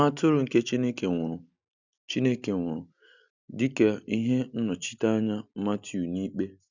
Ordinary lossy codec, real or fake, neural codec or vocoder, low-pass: none; real; none; 7.2 kHz